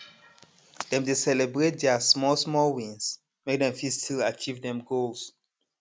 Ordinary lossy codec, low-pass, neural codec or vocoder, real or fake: none; none; none; real